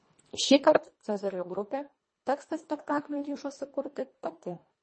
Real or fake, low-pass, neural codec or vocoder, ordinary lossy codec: fake; 9.9 kHz; codec, 24 kHz, 1.5 kbps, HILCodec; MP3, 32 kbps